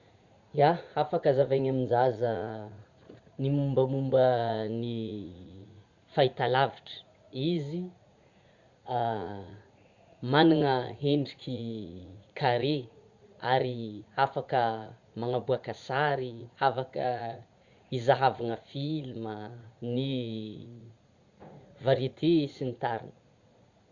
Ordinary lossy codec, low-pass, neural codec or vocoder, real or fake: Opus, 64 kbps; 7.2 kHz; vocoder, 44.1 kHz, 80 mel bands, Vocos; fake